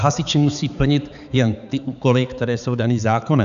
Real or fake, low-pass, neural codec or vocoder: fake; 7.2 kHz; codec, 16 kHz, 4 kbps, X-Codec, HuBERT features, trained on balanced general audio